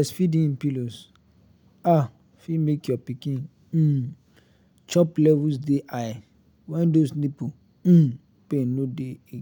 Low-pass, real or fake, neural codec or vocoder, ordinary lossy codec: 19.8 kHz; real; none; none